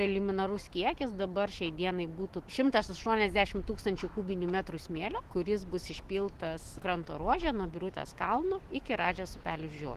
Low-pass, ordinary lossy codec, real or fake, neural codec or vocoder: 14.4 kHz; Opus, 16 kbps; fake; autoencoder, 48 kHz, 128 numbers a frame, DAC-VAE, trained on Japanese speech